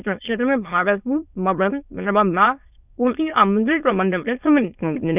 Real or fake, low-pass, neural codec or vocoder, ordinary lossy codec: fake; 3.6 kHz; autoencoder, 22.05 kHz, a latent of 192 numbers a frame, VITS, trained on many speakers; none